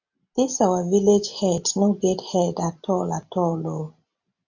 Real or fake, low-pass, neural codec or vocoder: real; 7.2 kHz; none